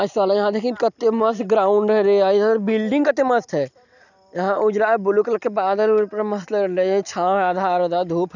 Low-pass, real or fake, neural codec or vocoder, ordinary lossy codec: 7.2 kHz; real; none; none